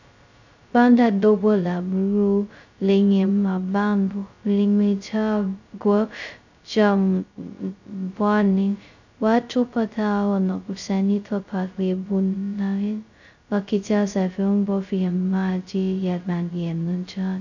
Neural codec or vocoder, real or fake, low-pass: codec, 16 kHz, 0.2 kbps, FocalCodec; fake; 7.2 kHz